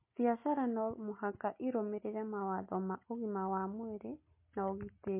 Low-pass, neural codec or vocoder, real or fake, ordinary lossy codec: 3.6 kHz; none; real; MP3, 24 kbps